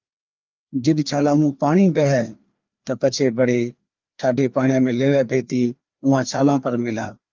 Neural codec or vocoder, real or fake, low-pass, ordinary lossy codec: codec, 16 kHz, 2 kbps, FreqCodec, larger model; fake; 7.2 kHz; Opus, 24 kbps